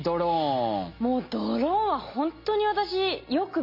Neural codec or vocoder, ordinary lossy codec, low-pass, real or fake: none; MP3, 32 kbps; 5.4 kHz; real